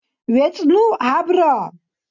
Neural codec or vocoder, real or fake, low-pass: none; real; 7.2 kHz